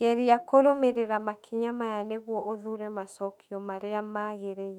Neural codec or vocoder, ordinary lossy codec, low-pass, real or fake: autoencoder, 48 kHz, 32 numbers a frame, DAC-VAE, trained on Japanese speech; none; 19.8 kHz; fake